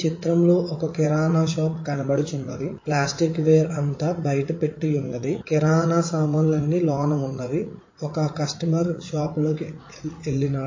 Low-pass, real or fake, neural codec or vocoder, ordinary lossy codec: 7.2 kHz; fake; vocoder, 44.1 kHz, 80 mel bands, Vocos; MP3, 32 kbps